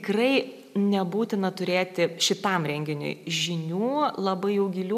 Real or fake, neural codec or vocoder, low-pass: real; none; 14.4 kHz